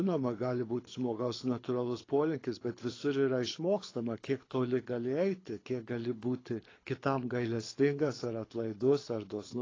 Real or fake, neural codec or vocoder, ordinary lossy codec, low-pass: fake; codec, 24 kHz, 6 kbps, HILCodec; AAC, 32 kbps; 7.2 kHz